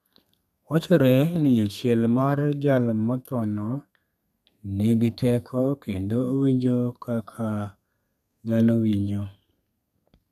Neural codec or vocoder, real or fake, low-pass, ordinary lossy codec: codec, 32 kHz, 1.9 kbps, SNAC; fake; 14.4 kHz; none